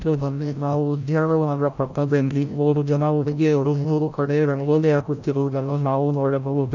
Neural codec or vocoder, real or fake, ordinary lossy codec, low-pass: codec, 16 kHz, 0.5 kbps, FreqCodec, larger model; fake; none; 7.2 kHz